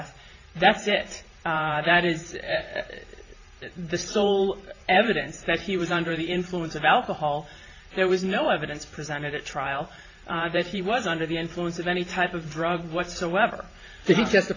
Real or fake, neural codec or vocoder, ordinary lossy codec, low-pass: fake; vocoder, 44.1 kHz, 128 mel bands every 512 samples, BigVGAN v2; AAC, 32 kbps; 7.2 kHz